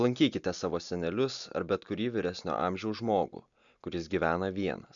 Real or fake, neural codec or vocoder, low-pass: real; none; 7.2 kHz